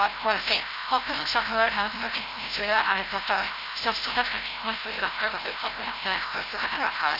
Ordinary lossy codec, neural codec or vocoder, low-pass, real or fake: none; codec, 16 kHz, 0.5 kbps, FreqCodec, larger model; 5.4 kHz; fake